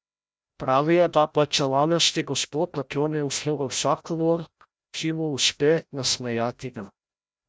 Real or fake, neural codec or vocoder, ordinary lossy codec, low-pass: fake; codec, 16 kHz, 0.5 kbps, FreqCodec, larger model; none; none